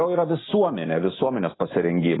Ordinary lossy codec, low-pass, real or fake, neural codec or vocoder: AAC, 16 kbps; 7.2 kHz; real; none